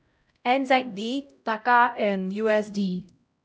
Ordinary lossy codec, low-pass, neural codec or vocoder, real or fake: none; none; codec, 16 kHz, 0.5 kbps, X-Codec, HuBERT features, trained on LibriSpeech; fake